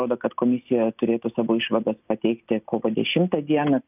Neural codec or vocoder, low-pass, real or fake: none; 3.6 kHz; real